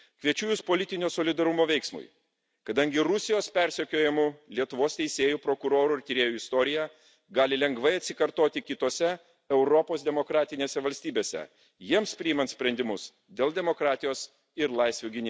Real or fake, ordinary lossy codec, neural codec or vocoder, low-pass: real; none; none; none